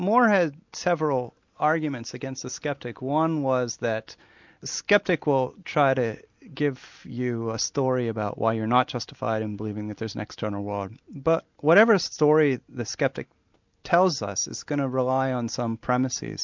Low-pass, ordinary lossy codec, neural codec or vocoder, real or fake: 7.2 kHz; MP3, 64 kbps; none; real